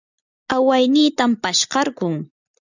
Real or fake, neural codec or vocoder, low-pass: real; none; 7.2 kHz